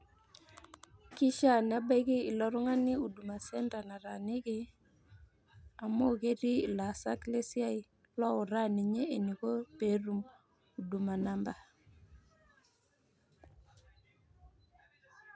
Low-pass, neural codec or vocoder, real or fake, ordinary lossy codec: none; none; real; none